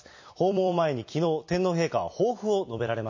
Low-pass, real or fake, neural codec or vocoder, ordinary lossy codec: 7.2 kHz; fake; vocoder, 44.1 kHz, 128 mel bands every 256 samples, BigVGAN v2; MP3, 32 kbps